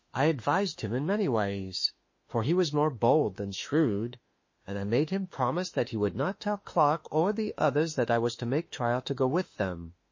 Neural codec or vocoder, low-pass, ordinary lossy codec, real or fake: autoencoder, 48 kHz, 32 numbers a frame, DAC-VAE, trained on Japanese speech; 7.2 kHz; MP3, 32 kbps; fake